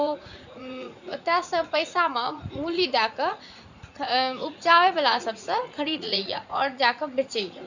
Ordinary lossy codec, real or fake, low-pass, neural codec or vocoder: none; fake; 7.2 kHz; vocoder, 44.1 kHz, 80 mel bands, Vocos